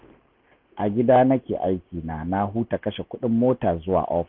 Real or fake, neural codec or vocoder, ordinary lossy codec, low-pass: real; none; none; 5.4 kHz